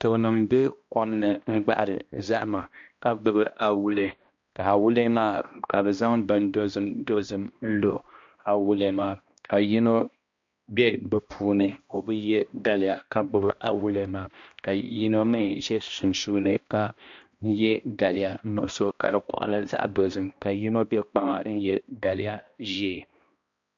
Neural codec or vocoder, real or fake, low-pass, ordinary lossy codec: codec, 16 kHz, 1 kbps, X-Codec, HuBERT features, trained on balanced general audio; fake; 7.2 kHz; MP3, 48 kbps